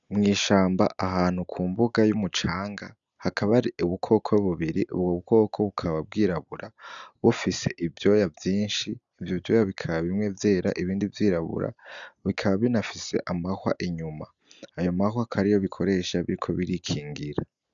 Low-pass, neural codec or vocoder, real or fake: 7.2 kHz; none; real